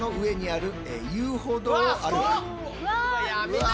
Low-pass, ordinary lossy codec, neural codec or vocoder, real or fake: none; none; none; real